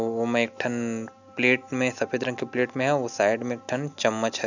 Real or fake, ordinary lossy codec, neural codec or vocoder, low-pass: real; none; none; 7.2 kHz